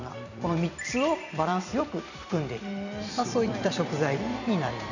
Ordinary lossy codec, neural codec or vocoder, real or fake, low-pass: none; vocoder, 44.1 kHz, 128 mel bands every 256 samples, BigVGAN v2; fake; 7.2 kHz